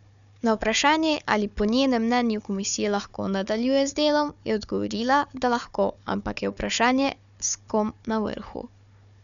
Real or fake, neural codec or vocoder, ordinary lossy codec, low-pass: fake; codec, 16 kHz, 4 kbps, FunCodec, trained on Chinese and English, 50 frames a second; none; 7.2 kHz